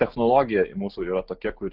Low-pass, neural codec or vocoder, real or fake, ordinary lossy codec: 5.4 kHz; none; real; Opus, 32 kbps